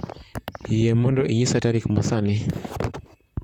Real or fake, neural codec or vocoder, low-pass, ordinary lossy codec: fake; vocoder, 44.1 kHz, 128 mel bands, Pupu-Vocoder; 19.8 kHz; none